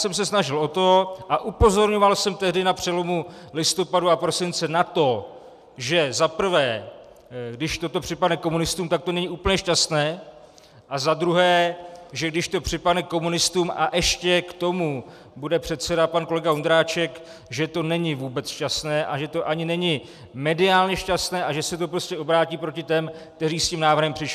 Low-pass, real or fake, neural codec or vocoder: 14.4 kHz; real; none